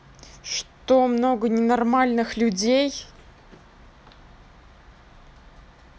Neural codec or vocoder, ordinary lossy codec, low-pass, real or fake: none; none; none; real